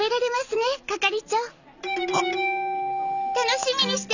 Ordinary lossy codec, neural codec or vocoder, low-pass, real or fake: none; none; 7.2 kHz; real